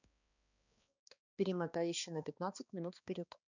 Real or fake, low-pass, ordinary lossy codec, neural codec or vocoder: fake; 7.2 kHz; none; codec, 16 kHz, 2 kbps, X-Codec, HuBERT features, trained on balanced general audio